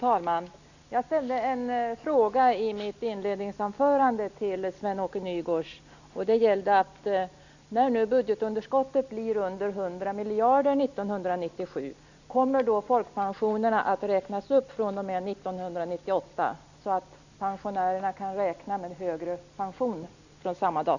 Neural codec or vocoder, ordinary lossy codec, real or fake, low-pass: none; none; real; 7.2 kHz